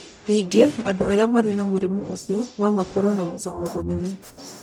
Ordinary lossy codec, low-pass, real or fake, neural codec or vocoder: none; 19.8 kHz; fake; codec, 44.1 kHz, 0.9 kbps, DAC